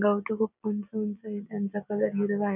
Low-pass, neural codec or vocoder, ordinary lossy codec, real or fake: 3.6 kHz; none; none; real